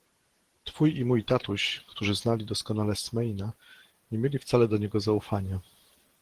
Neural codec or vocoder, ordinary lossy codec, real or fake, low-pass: vocoder, 44.1 kHz, 128 mel bands every 512 samples, BigVGAN v2; Opus, 16 kbps; fake; 14.4 kHz